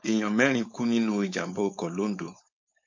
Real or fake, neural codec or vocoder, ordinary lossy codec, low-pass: fake; codec, 16 kHz, 4.8 kbps, FACodec; MP3, 48 kbps; 7.2 kHz